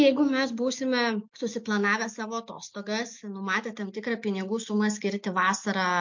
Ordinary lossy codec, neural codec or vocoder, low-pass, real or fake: MP3, 48 kbps; none; 7.2 kHz; real